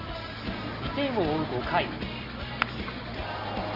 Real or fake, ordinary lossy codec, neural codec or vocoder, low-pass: real; Opus, 32 kbps; none; 5.4 kHz